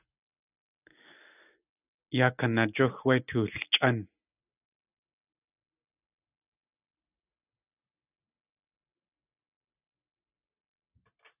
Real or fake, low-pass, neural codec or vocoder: real; 3.6 kHz; none